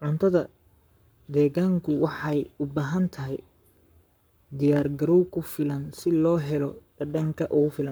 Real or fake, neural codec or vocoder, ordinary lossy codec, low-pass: fake; codec, 44.1 kHz, 7.8 kbps, Pupu-Codec; none; none